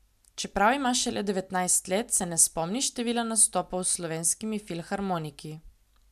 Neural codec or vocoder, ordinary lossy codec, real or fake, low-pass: none; MP3, 96 kbps; real; 14.4 kHz